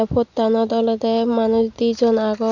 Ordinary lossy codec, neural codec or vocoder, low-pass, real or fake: none; none; 7.2 kHz; real